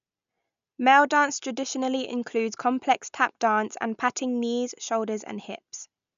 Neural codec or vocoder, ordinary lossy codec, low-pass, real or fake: none; none; 7.2 kHz; real